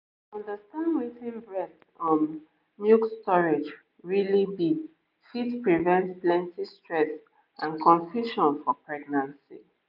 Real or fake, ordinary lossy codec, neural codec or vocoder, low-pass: real; none; none; 5.4 kHz